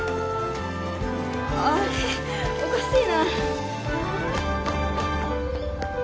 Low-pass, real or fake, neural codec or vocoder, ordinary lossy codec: none; real; none; none